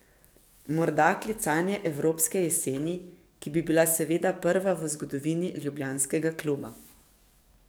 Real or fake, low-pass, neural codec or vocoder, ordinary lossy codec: fake; none; codec, 44.1 kHz, 7.8 kbps, DAC; none